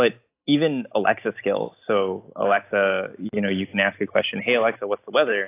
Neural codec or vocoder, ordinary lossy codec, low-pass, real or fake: none; AAC, 24 kbps; 3.6 kHz; real